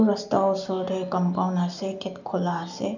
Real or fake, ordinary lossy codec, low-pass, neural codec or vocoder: real; none; 7.2 kHz; none